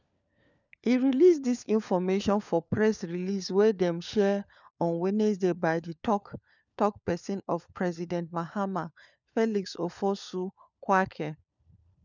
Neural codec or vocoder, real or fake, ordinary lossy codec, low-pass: codec, 16 kHz, 4 kbps, FunCodec, trained on LibriTTS, 50 frames a second; fake; none; 7.2 kHz